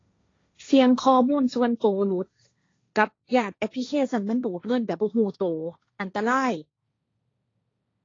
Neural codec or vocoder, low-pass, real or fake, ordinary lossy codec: codec, 16 kHz, 1.1 kbps, Voila-Tokenizer; 7.2 kHz; fake; AAC, 32 kbps